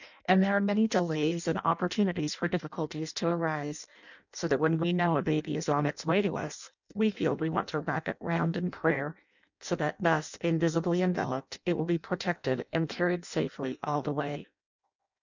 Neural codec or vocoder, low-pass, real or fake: codec, 16 kHz in and 24 kHz out, 0.6 kbps, FireRedTTS-2 codec; 7.2 kHz; fake